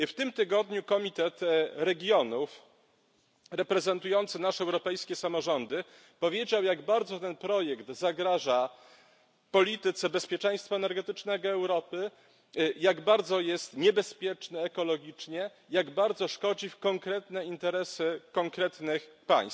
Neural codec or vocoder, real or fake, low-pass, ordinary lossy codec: none; real; none; none